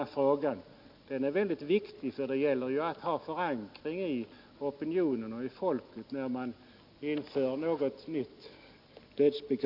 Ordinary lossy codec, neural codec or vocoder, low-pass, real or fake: none; none; 5.4 kHz; real